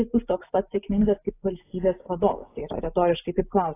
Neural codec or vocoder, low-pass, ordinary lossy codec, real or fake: none; 3.6 kHz; AAC, 16 kbps; real